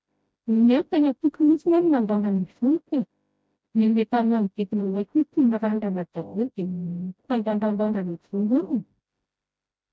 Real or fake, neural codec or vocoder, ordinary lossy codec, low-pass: fake; codec, 16 kHz, 0.5 kbps, FreqCodec, smaller model; none; none